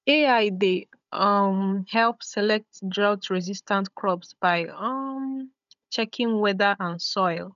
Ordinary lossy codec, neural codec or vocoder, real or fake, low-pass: none; codec, 16 kHz, 16 kbps, FunCodec, trained on Chinese and English, 50 frames a second; fake; 7.2 kHz